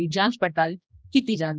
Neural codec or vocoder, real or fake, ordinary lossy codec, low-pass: codec, 16 kHz, 1 kbps, X-Codec, HuBERT features, trained on general audio; fake; none; none